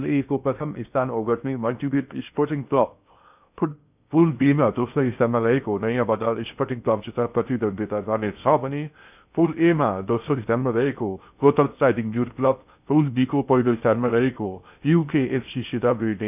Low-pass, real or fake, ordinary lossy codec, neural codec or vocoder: 3.6 kHz; fake; none; codec, 16 kHz in and 24 kHz out, 0.6 kbps, FocalCodec, streaming, 2048 codes